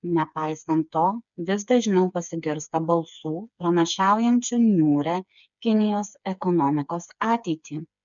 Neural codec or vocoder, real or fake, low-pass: codec, 16 kHz, 4 kbps, FreqCodec, smaller model; fake; 7.2 kHz